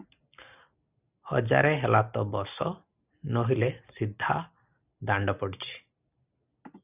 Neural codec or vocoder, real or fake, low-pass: none; real; 3.6 kHz